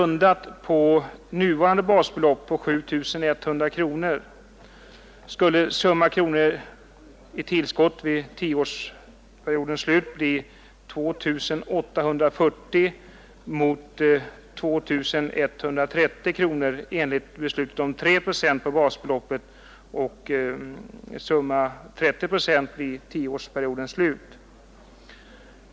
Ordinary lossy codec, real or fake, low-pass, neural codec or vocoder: none; real; none; none